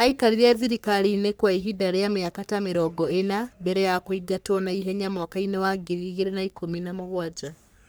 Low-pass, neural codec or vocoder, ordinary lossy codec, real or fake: none; codec, 44.1 kHz, 3.4 kbps, Pupu-Codec; none; fake